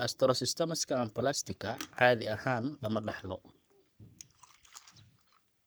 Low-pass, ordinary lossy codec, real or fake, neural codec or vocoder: none; none; fake; codec, 44.1 kHz, 3.4 kbps, Pupu-Codec